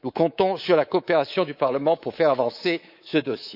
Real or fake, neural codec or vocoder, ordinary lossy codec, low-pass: fake; codec, 24 kHz, 3.1 kbps, DualCodec; none; 5.4 kHz